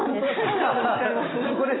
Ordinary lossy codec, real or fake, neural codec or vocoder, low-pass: AAC, 16 kbps; real; none; 7.2 kHz